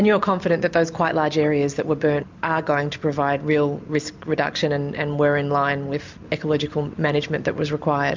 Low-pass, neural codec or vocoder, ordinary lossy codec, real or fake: 7.2 kHz; none; MP3, 64 kbps; real